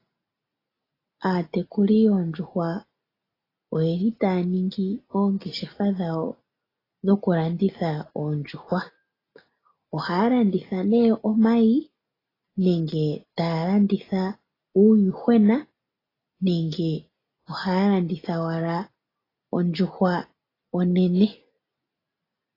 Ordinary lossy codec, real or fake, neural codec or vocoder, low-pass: AAC, 24 kbps; real; none; 5.4 kHz